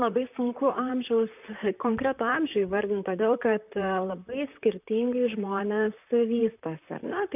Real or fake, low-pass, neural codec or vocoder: fake; 3.6 kHz; vocoder, 44.1 kHz, 128 mel bands, Pupu-Vocoder